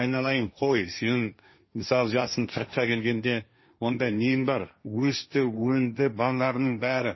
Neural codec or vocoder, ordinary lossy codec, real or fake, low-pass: codec, 16 kHz, 1.1 kbps, Voila-Tokenizer; MP3, 24 kbps; fake; 7.2 kHz